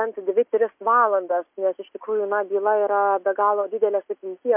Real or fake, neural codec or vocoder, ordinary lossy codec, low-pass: real; none; MP3, 32 kbps; 3.6 kHz